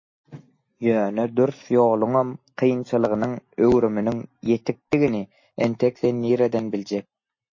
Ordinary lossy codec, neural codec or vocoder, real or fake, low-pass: MP3, 32 kbps; none; real; 7.2 kHz